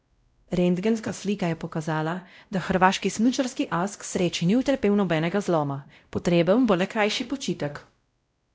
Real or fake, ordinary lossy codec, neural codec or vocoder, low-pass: fake; none; codec, 16 kHz, 0.5 kbps, X-Codec, WavLM features, trained on Multilingual LibriSpeech; none